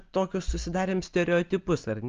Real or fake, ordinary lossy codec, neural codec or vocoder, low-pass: real; Opus, 32 kbps; none; 7.2 kHz